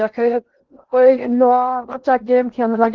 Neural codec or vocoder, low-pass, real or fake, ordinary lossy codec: codec, 16 kHz in and 24 kHz out, 0.8 kbps, FocalCodec, streaming, 65536 codes; 7.2 kHz; fake; Opus, 16 kbps